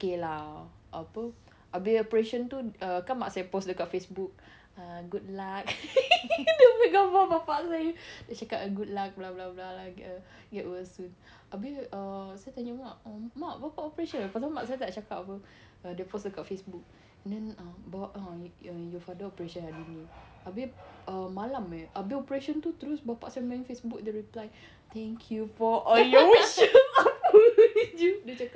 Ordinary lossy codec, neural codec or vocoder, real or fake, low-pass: none; none; real; none